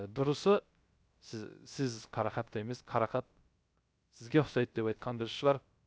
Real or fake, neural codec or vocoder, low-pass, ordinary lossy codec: fake; codec, 16 kHz, 0.3 kbps, FocalCodec; none; none